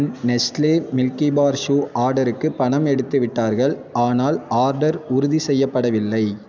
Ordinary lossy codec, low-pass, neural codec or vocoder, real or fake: none; none; none; real